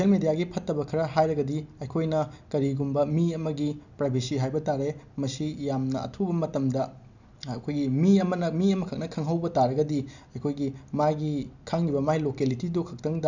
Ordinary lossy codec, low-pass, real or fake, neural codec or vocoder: none; 7.2 kHz; real; none